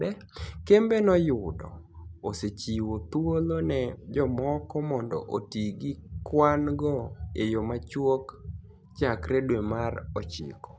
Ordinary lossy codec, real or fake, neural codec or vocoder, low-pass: none; real; none; none